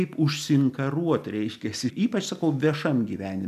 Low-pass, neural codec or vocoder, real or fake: 14.4 kHz; none; real